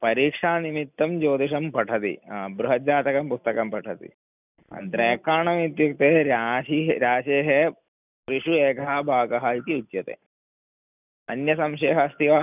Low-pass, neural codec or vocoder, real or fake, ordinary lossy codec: 3.6 kHz; none; real; none